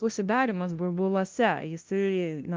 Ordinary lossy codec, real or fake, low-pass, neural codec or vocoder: Opus, 24 kbps; fake; 7.2 kHz; codec, 16 kHz, 0.5 kbps, FunCodec, trained on LibriTTS, 25 frames a second